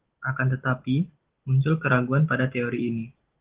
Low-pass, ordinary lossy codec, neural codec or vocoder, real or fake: 3.6 kHz; Opus, 16 kbps; none; real